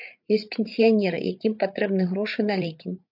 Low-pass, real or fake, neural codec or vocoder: 5.4 kHz; fake; codec, 16 kHz, 8 kbps, FreqCodec, larger model